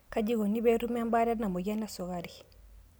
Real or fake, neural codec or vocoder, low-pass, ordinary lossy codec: real; none; none; none